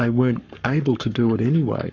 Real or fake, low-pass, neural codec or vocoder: fake; 7.2 kHz; codec, 44.1 kHz, 7.8 kbps, Pupu-Codec